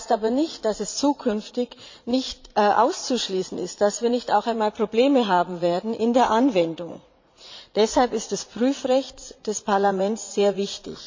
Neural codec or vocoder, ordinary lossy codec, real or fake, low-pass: vocoder, 44.1 kHz, 80 mel bands, Vocos; MP3, 48 kbps; fake; 7.2 kHz